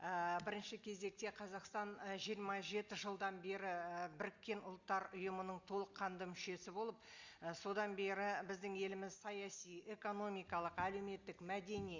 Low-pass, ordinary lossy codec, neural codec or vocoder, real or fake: 7.2 kHz; Opus, 64 kbps; none; real